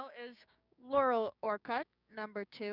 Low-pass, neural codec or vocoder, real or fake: 5.4 kHz; codec, 16 kHz, 6 kbps, DAC; fake